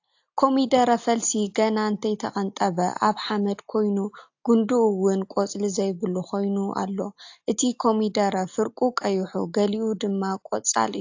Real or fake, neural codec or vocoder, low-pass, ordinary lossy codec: real; none; 7.2 kHz; AAC, 48 kbps